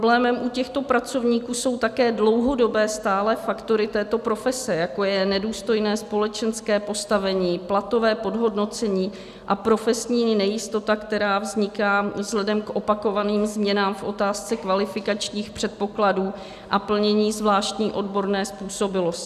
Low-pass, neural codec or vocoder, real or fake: 14.4 kHz; none; real